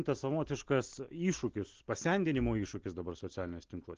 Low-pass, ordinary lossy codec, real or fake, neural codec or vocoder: 7.2 kHz; Opus, 16 kbps; real; none